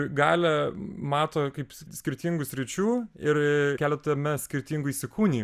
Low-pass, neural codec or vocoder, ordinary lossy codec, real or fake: 14.4 kHz; none; Opus, 64 kbps; real